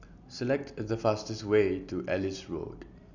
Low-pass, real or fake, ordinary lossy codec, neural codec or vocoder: 7.2 kHz; real; none; none